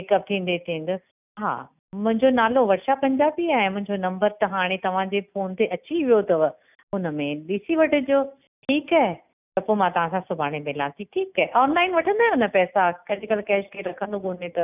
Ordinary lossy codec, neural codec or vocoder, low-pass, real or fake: none; none; 3.6 kHz; real